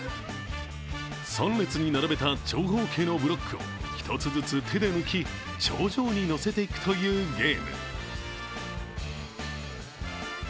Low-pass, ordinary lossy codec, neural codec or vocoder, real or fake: none; none; none; real